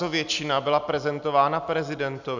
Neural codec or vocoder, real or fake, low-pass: none; real; 7.2 kHz